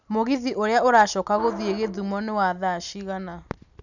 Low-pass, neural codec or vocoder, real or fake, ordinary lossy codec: 7.2 kHz; none; real; none